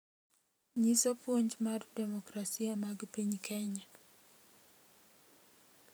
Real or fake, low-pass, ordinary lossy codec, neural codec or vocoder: fake; none; none; vocoder, 44.1 kHz, 128 mel bands every 512 samples, BigVGAN v2